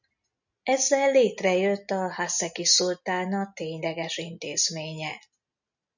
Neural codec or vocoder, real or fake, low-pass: none; real; 7.2 kHz